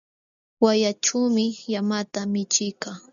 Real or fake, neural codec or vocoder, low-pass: real; none; 7.2 kHz